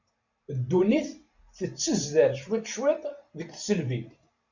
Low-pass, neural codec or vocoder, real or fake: 7.2 kHz; vocoder, 44.1 kHz, 128 mel bands every 512 samples, BigVGAN v2; fake